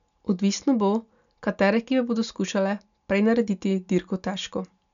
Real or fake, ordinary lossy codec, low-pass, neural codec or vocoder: real; none; 7.2 kHz; none